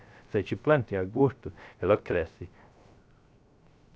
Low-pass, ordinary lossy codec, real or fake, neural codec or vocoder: none; none; fake; codec, 16 kHz, 0.3 kbps, FocalCodec